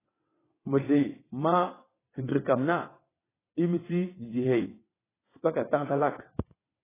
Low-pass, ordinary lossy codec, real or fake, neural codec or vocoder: 3.6 kHz; AAC, 16 kbps; fake; vocoder, 22.05 kHz, 80 mel bands, WaveNeXt